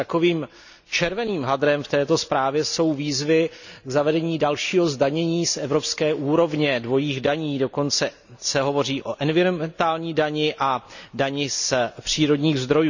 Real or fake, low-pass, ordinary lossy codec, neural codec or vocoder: real; 7.2 kHz; none; none